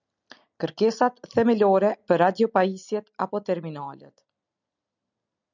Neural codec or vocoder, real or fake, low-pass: none; real; 7.2 kHz